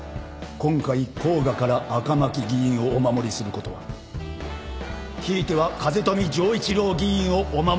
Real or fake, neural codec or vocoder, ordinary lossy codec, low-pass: real; none; none; none